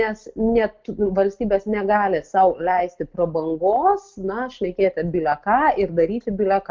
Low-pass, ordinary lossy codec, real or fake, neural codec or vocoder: 7.2 kHz; Opus, 32 kbps; real; none